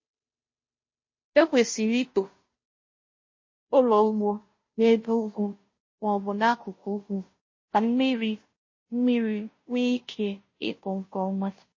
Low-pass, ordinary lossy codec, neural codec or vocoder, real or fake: 7.2 kHz; MP3, 32 kbps; codec, 16 kHz, 0.5 kbps, FunCodec, trained on Chinese and English, 25 frames a second; fake